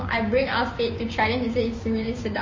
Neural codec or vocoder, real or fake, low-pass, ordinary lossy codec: codec, 16 kHz, 6 kbps, DAC; fake; 7.2 kHz; MP3, 32 kbps